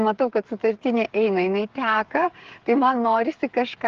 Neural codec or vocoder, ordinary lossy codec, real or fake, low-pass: codec, 16 kHz, 8 kbps, FreqCodec, smaller model; Opus, 16 kbps; fake; 7.2 kHz